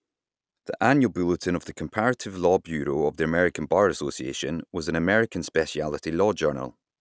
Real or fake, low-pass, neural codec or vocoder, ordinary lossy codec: real; none; none; none